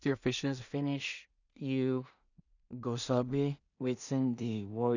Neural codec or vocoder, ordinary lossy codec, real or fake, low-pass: codec, 16 kHz in and 24 kHz out, 0.4 kbps, LongCat-Audio-Codec, two codebook decoder; none; fake; 7.2 kHz